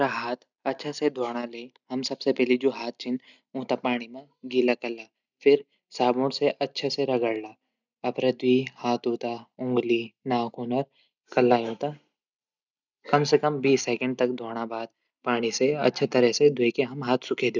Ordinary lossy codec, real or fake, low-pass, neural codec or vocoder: none; real; 7.2 kHz; none